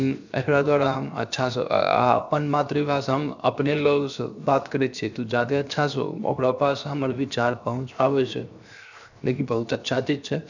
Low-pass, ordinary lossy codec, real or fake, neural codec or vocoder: 7.2 kHz; none; fake; codec, 16 kHz, 0.7 kbps, FocalCodec